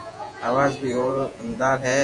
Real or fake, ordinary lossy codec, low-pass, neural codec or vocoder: fake; Opus, 64 kbps; 10.8 kHz; vocoder, 48 kHz, 128 mel bands, Vocos